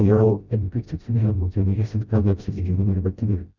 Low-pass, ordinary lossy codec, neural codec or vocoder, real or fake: 7.2 kHz; Opus, 64 kbps; codec, 16 kHz, 0.5 kbps, FreqCodec, smaller model; fake